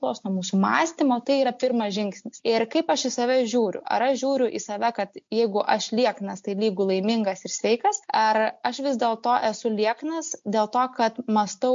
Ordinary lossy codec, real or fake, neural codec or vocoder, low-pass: MP3, 48 kbps; real; none; 7.2 kHz